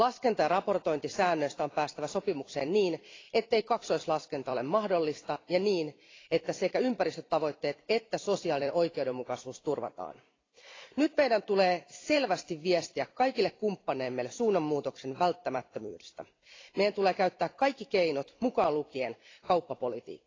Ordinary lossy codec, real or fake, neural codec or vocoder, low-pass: AAC, 32 kbps; fake; vocoder, 44.1 kHz, 128 mel bands every 256 samples, BigVGAN v2; 7.2 kHz